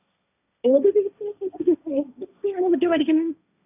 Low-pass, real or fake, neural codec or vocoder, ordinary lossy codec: 3.6 kHz; fake; codec, 16 kHz, 1.1 kbps, Voila-Tokenizer; none